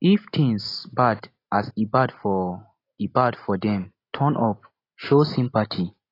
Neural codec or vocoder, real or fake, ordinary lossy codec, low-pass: none; real; AAC, 24 kbps; 5.4 kHz